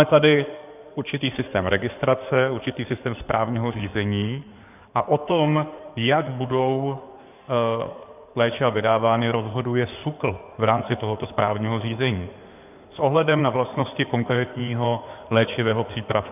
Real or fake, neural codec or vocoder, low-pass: fake; codec, 16 kHz in and 24 kHz out, 2.2 kbps, FireRedTTS-2 codec; 3.6 kHz